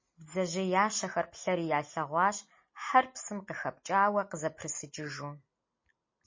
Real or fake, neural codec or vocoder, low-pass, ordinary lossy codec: real; none; 7.2 kHz; MP3, 32 kbps